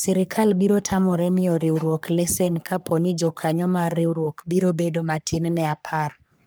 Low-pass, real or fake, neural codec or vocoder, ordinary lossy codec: none; fake; codec, 44.1 kHz, 3.4 kbps, Pupu-Codec; none